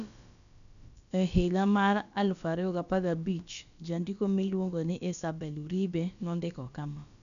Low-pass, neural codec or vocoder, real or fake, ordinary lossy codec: 7.2 kHz; codec, 16 kHz, about 1 kbps, DyCAST, with the encoder's durations; fake; none